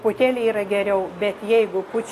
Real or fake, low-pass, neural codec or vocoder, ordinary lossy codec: real; 14.4 kHz; none; AAC, 48 kbps